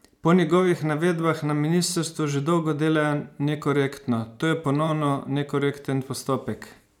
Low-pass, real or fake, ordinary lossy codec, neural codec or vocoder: 19.8 kHz; fake; none; vocoder, 44.1 kHz, 128 mel bands every 512 samples, BigVGAN v2